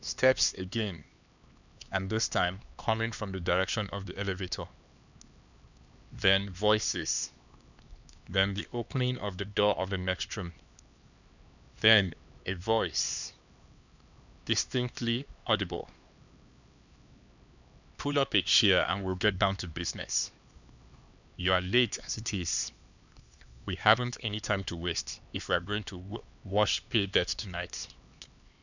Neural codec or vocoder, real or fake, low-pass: codec, 16 kHz, 2 kbps, X-Codec, HuBERT features, trained on LibriSpeech; fake; 7.2 kHz